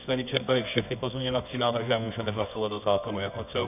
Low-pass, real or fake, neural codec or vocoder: 3.6 kHz; fake; codec, 24 kHz, 0.9 kbps, WavTokenizer, medium music audio release